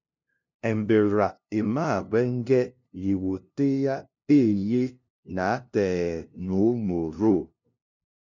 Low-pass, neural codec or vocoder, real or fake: 7.2 kHz; codec, 16 kHz, 0.5 kbps, FunCodec, trained on LibriTTS, 25 frames a second; fake